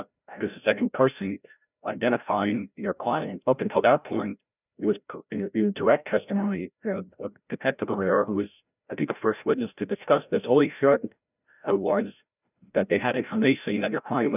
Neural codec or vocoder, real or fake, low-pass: codec, 16 kHz, 0.5 kbps, FreqCodec, larger model; fake; 3.6 kHz